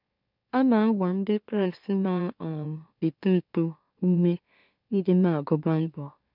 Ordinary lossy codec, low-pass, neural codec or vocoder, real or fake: none; 5.4 kHz; autoencoder, 44.1 kHz, a latent of 192 numbers a frame, MeloTTS; fake